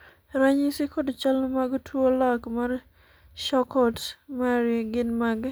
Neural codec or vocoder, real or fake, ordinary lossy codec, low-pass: none; real; none; none